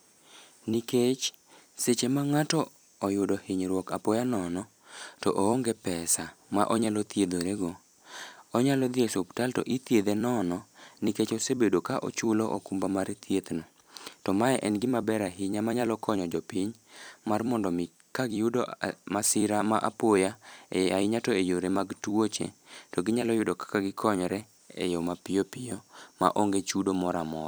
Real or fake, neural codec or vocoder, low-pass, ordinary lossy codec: fake; vocoder, 44.1 kHz, 128 mel bands every 256 samples, BigVGAN v2; none; none